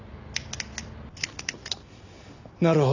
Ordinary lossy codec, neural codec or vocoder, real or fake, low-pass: none; none; real; 7.2 kHz